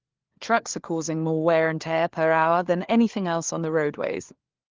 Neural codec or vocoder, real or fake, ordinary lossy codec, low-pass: codec, 16 kHz, 4 kbps, FunCodec, trained on LibriTTS, 50 frames a second; fake; Opus, 16 kbps; 7.2 kHz